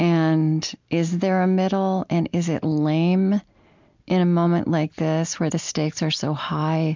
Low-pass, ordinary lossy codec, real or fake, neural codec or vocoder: 7.2 kHz; MP3, 64 kbps; real; none